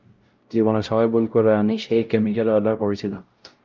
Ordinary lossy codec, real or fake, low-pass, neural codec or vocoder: Opus, 32 kbps; fake; 7.2 kHz; codec, 16 kHz, 0.5 kbps, X-Codec, WavLM features, trained on Multilingual LibriSpeech